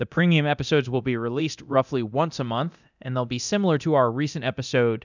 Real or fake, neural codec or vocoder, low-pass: fake; codec, 24 kHz, 0.9 kbps, DualCodec; 7.2 kHz